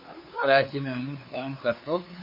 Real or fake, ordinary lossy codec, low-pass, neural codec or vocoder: fake; MP3, 24 kbps; 5.4 kHz; codec, 16 kHz, 2 kbps, FunCodec, trained on LibriTTS, 25 frames a second